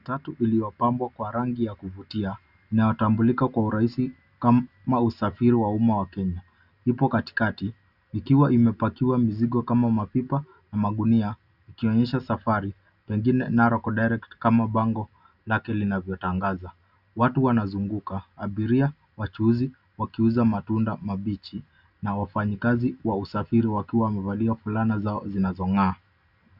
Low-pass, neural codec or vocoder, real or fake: 5.4 kHz; none; real